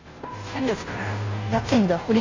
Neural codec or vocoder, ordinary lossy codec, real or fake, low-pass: codec, 16 kHz, 0.5 kbps, FunCodec, trained on Chinese and English, 25 frames a second; AAC, 32 kbps; fake; 7.2 kHz